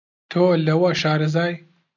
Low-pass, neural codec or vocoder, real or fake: 7.2 kHz; none; real